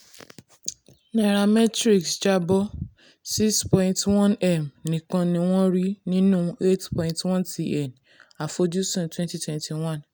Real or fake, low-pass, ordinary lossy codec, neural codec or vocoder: real; none; none; none